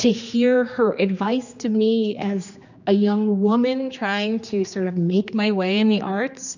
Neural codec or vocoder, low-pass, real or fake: codec, 16 kHz, 2 kbps, X-Codec, HuBERT features, trained on general audio; 7.2 kHz; fake